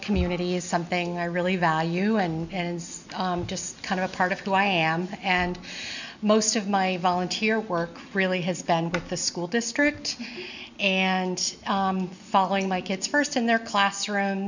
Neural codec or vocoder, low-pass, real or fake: none; 7.2 kHz; real